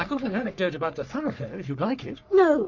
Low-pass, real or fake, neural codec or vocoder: 7.2 kHz; fake; codec, 44.1 kHz, 3.4 kbps, Pupu-Codec